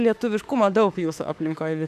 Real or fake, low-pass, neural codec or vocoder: fake; 14.4 kHz; autoencoder, 48 kHz, 32 numbers a frame, DAC-VAE, trained on Japanese speech